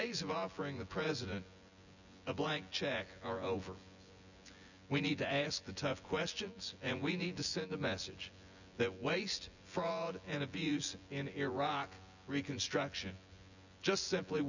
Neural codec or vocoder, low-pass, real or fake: vocoder, 24 kHz, 100 mel bands, Vocos; 7.2 kHz; fake